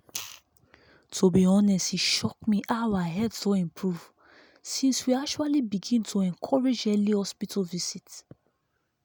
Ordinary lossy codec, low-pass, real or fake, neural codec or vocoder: none; none; real; none